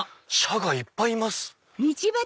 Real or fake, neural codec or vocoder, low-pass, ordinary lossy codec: real; none; none; none